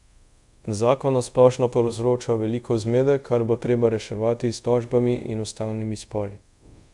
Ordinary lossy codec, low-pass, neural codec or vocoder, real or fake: none; 10.8 kHz; codec, 24 kHz, 0.5 kbps, DualCodec; fake